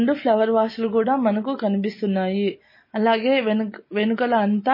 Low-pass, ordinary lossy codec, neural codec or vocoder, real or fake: 5.4 kHz; MP3, 24 kbps; none; real